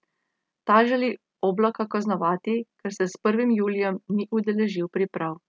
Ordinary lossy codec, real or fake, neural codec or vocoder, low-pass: none; real; none; none